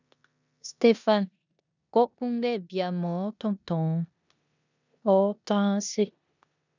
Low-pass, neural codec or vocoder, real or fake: 7.2 kHz; codec, 16 kHz in and 24 kHz out, 0.9 kbps, LongCat-Audio-Codec, four codebook decoder; fake